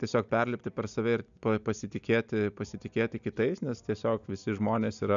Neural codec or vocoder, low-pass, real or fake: none; 7.2 kHz; real